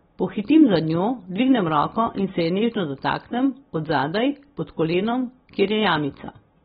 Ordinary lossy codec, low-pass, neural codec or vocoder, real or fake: AAC, 16 kbps; 19.8 kHz; none; real